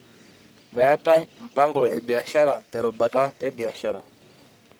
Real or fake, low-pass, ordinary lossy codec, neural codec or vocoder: fake; none; none; codec, 44.1 kHz, 1.7 kbps, Pupu-Codec